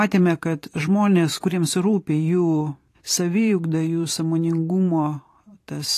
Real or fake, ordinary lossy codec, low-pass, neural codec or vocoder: fake; AAC, 48 kbps; 14.4 kHz; vocoder, 44.1 kHz, 128 mel bands every 512 samples, BigVGAN v2